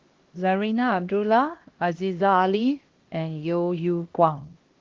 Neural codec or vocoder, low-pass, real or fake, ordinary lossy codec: codec, 16 kHz, 1 kbps, X-Codec, HuBERT features, trained on LibriSpeech; 7.2 kHz; fake; Opus, 16 kbps